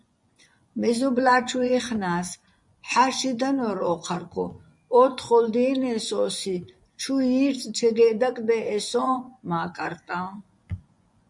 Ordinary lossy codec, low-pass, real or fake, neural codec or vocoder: MP3, 96 kbps; 10.8 kHz; fake; vocoder, 24 kHz, 100 mel bands, Vocos